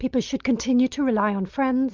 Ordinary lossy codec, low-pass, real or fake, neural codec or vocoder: Opus, 24 kbps; 7.2 kHz; real; none